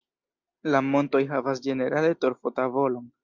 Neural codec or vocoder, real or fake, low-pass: none; real; 7.2 kHz